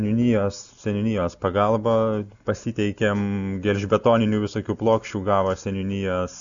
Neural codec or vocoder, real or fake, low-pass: none; real; 7.2 kHz